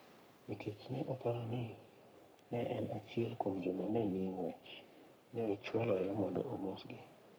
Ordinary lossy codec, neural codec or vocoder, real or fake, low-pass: none; codec, 44.1 kHz, 3.4 kbps, Pupu-Codec; fake; none